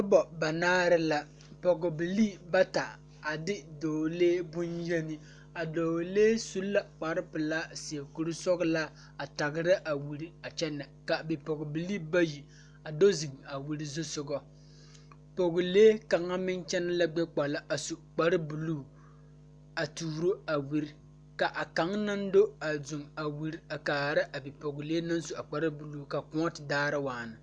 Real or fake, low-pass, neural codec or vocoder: real; 9.9 kHz; none